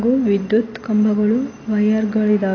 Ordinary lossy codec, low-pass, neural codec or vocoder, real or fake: AAC, 32 kbps; 7.2 kHz; none; real